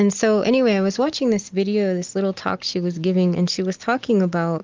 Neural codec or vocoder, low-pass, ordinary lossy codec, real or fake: none; 7.2 kHz; Opus, 32 kbps; real